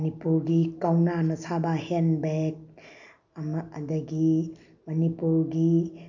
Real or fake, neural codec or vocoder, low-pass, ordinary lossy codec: real; none; 7.2 kHz; none